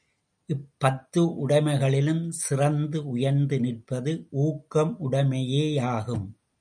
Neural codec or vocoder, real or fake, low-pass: none; real; 9.9 kHz